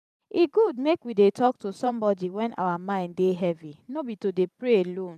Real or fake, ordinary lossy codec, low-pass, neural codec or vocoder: fake; none; 14.4 kHz; vocoder, 44.1 kHz, 128 mel bands every 512 samples, BigVGAN v2